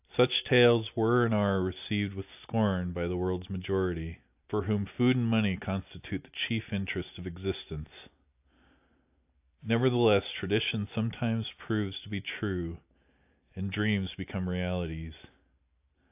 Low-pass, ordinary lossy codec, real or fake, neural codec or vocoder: 3.6 kHz; AAC, 32 kbps; real; none